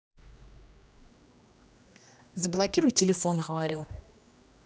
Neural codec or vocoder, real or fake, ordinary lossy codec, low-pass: codec, 16 kHz, 1 kbps, X-Codec, HuBERT features, trained on general audio; fake; none; none